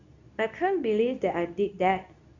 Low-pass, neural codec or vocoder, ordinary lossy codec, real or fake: 7.2 kHz; codec, 24 kHz, 0.9 kbps, WavTokenizer, medium speech release version 2; none; fake